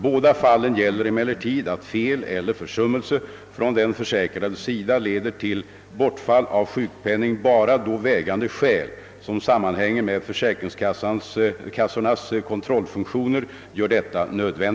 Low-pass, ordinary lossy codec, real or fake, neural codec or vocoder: none; none; real; none